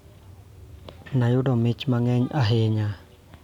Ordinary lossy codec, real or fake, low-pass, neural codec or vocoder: none; real; 19.8 kHz; none